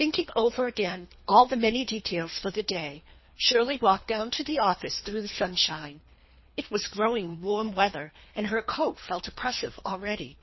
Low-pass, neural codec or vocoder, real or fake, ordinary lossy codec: 7.2 kHz; codec, 24 kHz, 3 kbps, HILCodec; fake; MP3, 24 kbps